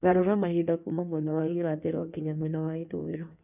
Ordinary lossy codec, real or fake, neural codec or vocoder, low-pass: none; fake; codec, 16 kHz in and 24 kHz out, 1.1 kbps, FireRedTTS-2 codec; 3.6 kHz